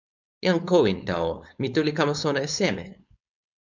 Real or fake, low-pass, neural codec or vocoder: fake; 7.2 kHz; codec, 16 kHz, 4.8 kbps, FACodec